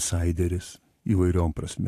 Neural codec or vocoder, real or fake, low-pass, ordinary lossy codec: vocoder, 44.1 kHz, 128 mel bands every 512 samples, BigVGAN v2; fake; 14.4 kHz; AAC, 64 kbps